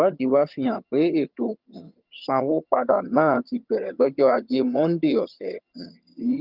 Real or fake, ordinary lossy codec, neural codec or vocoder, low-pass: fake; Opus, 24 kbps; vocoder, 22.05 kHz, 80 mel bands, HiFi-GAN; 5.4 kHz